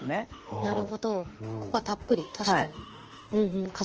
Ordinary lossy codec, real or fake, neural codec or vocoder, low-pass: Opus, 16 kbps; fake; autoencoder, 48 kHz, 32 numbers a frame, DAC-VAE, trained on Japanese speech; 7.2 kHz